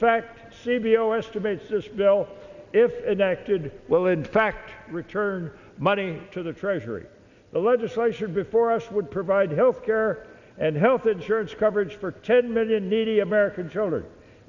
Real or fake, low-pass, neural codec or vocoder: real; 7.2 kHz; none